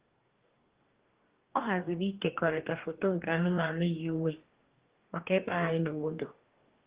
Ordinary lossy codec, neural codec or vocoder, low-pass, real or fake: Opus, 16 kbps; codec, 44.1 kHz, 2.6 kbps, DAC; 3.6 kHz; fake